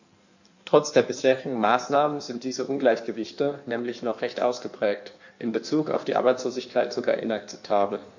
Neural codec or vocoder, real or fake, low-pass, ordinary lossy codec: codec, 16 kHz in and 24 kHz out, 1.1 kbps, FireRedTTS-2 codec; fake; 7.2 kHz; none